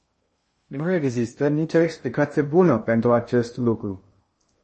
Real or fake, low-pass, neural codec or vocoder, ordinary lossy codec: fake; 10.8 kHz; codec, 16 kHz in and 24 kHz out, 0.6 kbps, FocalCodec, streaming, 2048 codes; MP3, 32 kbps